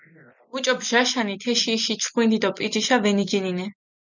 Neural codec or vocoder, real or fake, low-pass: none; real; 7.2 kHz